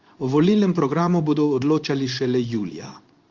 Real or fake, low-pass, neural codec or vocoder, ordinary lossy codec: fake; 7.2 kHz; codec, 16 kHz in and 24 kHz out, 1 kbps, XY-Tokenizer; Opus, 32 kbps